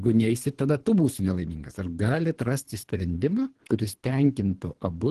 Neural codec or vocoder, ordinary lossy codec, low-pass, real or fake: codec, 24 kHz, 3 kbps, HILCodec; Opus, 16 kbps; 10.8 kHz; fake